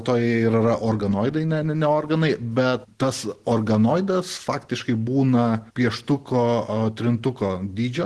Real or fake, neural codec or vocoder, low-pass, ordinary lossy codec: real; none; 10.8 kHz; Opus, 16 kbps